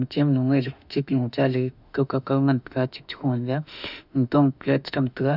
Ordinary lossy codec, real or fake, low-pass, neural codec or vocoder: none; fake; 5.4 kHz; autoencoder, 48 kHz, 32 numbers a frame, DAC-VAE, trained on Japanese speech